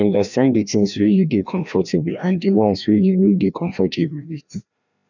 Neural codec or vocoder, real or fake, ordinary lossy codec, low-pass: codec, 16 kHz, 1 kbps, FreqCodec, larger model; fake; none; 7.2 kHz